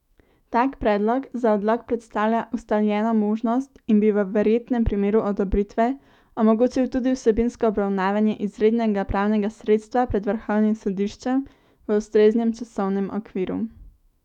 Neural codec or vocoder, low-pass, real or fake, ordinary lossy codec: autoencoder, 48 kHz, 128 numbers a frame, DAC-VAE, trained on Japanese speech; 19.8 kHz; fake; none